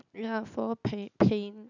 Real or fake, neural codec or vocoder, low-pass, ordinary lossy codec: real; none; 7.2 kHz; none